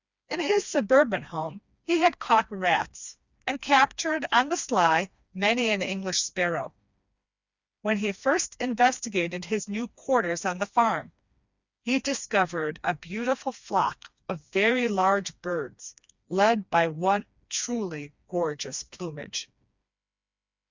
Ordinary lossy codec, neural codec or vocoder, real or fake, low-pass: Opus, 64 kbps; codec, 16 kHz, 2 kbps, FreqCodec, smaller model; fake; 7.2 kHz